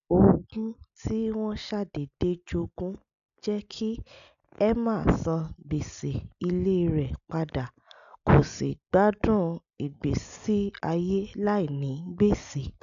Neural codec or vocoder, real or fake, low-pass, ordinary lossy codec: none; real; 7.2 kHz; none